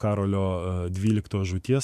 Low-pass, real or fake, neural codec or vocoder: 14.4 kHz; fake; autoencoder, 48 kHz, 128 numbers a frame, DAC-VAE, trained on Japanese speech